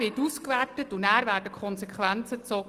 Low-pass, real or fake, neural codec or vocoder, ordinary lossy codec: 14.4 kHz; real; none; Opus, 24 kbps